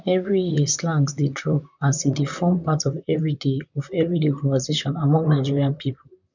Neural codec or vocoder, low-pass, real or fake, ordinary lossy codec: vocoder, 44.1 kHz, 128 mel bands, Pupu-Vocoder; 7.2 kHz; fake; none